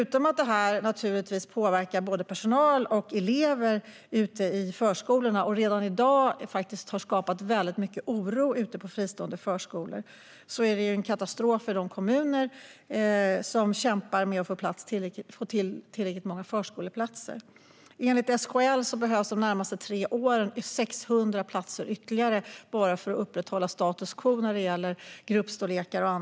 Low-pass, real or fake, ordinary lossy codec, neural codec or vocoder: none; real; none; none